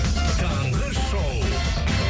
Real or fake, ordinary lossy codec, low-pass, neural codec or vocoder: real; none; none; none